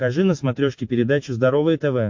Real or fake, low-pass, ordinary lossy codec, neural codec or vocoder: real; 7.2 kHz; MP3, 48 kbps; none